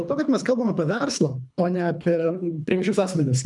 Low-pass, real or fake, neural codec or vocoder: 10.8 kHz; fake; codec, 24 kHz, 3 kbps, HILCodec